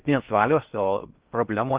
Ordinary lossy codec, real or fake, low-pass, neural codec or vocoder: Opus, 32 kbps; fake; 3.6 kHz; codec, 16 kHz in and 24 kHz out, 0.6 kbps, FocalCodec, streaming, 4096 codes